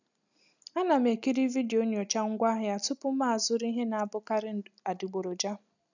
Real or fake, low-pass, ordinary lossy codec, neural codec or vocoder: real; 7.2 kHz; none; none